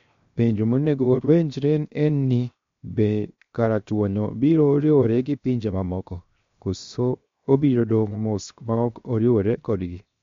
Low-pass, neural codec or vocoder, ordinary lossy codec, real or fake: 7.2 kHz; codec, 16 kHz, 0.7 kbps, FocalCodec; MP3, 48 kbps; fake